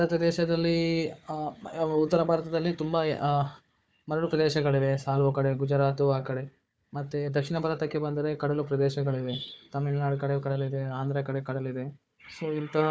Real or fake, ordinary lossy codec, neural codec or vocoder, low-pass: fake; none; codec, 16 kHz, 4 kbps, FunCodec, trained on Chinese and English, 50 frames a second; none